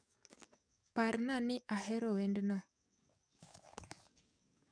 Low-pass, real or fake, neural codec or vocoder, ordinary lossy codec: 9.9 kHz; fake; codec, 44.1 kHz, 7.8 kbps, DAC; none